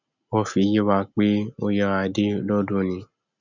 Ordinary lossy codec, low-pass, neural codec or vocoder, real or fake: none; 7.2 kHz; none; real